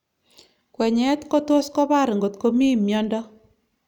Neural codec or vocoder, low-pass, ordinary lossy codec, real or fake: none; 19.8 kHz; none; real